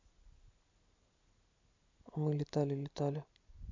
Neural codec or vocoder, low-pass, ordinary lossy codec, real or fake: none; 7.2 kHz; MP3, 64 kbps; real